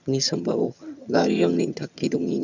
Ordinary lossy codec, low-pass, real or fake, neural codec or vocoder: none; 7.2 kHz; fake; vocoder, 22.05 kHz, 80 mel bands, HiFi-GAN